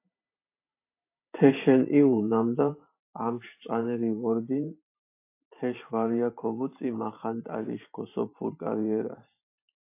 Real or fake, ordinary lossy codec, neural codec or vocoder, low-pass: fake; AAC, 24 kbps; vocoder, 44.1 kHz, 128 mel bands every 512 samples, BigVGAN v2; 3.6 kHz